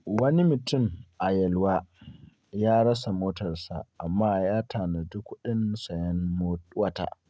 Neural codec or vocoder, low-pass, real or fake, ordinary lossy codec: none; none; real; none